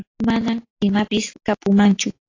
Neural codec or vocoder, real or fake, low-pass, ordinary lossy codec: none; real; 7.2 kHz; AAC, 32 kbps